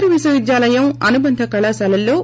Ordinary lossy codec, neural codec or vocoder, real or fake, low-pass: none; none; real; none